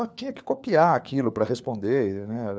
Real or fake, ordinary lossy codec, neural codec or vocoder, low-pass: fake; none; codec, 16 kHz, 4 kbps, FunCodec, trained on LibriTTS, 50 frames a second; none